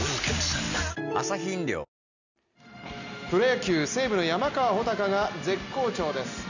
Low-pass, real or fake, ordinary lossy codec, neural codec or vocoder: 7.2 kHz; real; none; none